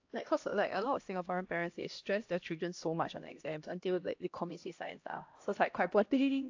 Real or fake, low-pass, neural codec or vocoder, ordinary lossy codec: fake; 7.2 kHz; codec, 16 kHz, 1 kbps, X-Codec, HuBERT features, trained on LibriSpeech; AAC, 48 kbps